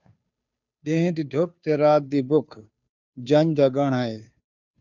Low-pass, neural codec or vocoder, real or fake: 7.2 kHz; codec, 16 kHz, 2 kbps, FunCodec, trained on Chinese and English, 25 frames a second; fake